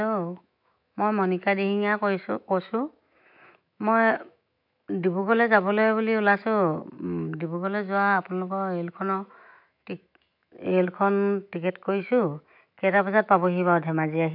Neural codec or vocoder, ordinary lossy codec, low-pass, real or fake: autoencoder, 48 kHz, 128 numbers a frame, DAC-VAE, trained on Japanese speech; none; 5.4 kHz; fake